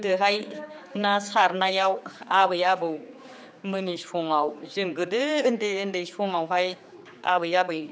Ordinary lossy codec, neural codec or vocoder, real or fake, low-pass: none; codec, 16 kHz, 4 kbps, X-Codec, HuBERT features, trained on general audio; fake; none